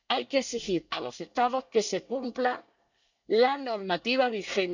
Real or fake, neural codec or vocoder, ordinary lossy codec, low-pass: fake; codec, 24 kHz, 1 kbps, SNAC; none; 7.2 kHz